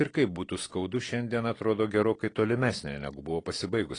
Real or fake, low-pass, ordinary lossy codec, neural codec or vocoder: real; 9.9 kHz; AAC, 32 kbps; none